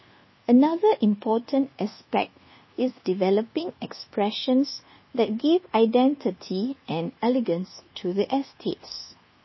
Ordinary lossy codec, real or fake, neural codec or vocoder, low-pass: MP3, 24 kbps; fake; codec, 24 kHz, 1.2 kbps, DualCodec; 7.2 kHz